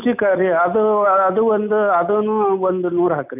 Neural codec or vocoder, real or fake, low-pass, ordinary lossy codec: none; real; 3.6 kHz; none